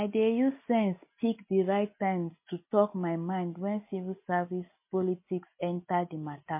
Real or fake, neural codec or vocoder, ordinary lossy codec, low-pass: real; none; MP3, 24 kbps; 3.6 kHz